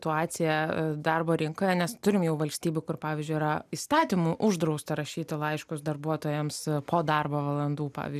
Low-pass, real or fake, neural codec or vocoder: 14.4 kHz; real; none